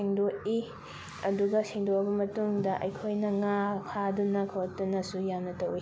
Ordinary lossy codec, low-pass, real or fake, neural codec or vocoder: none; none; real; none